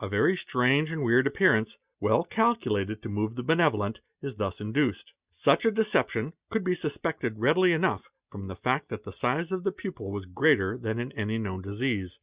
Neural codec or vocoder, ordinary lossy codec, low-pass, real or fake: none; Opus, 64 kbps; 3.6 kHz; real